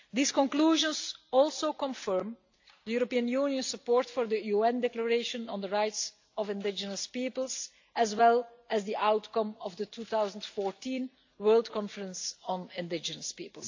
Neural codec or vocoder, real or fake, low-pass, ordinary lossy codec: none; real; 7.2 kHz; AAC, 48 kbps